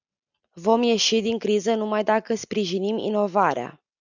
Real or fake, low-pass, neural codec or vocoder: real; 7.2 kHz; none